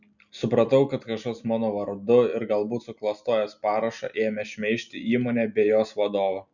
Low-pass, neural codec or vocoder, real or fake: 7.2 kHz; none; real